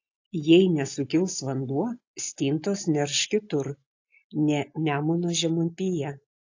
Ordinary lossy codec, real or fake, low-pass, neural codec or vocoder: AAC, 48 kbps; real; 7.2 kHz; none